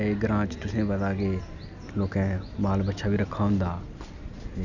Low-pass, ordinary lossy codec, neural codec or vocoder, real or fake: 7.2 kHz; none; none; real